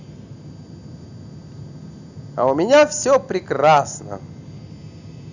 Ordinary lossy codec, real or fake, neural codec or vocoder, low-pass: none; real; none; 7.2 kHz